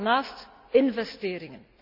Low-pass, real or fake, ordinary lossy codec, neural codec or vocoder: 5.4 kHz; real; none; none